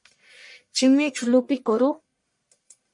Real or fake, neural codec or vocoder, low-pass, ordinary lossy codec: fake; codec, 44.1 kHz, 1.7 kbps, Pupu-Codec; 10.8 kHz; MP3, 48 kbps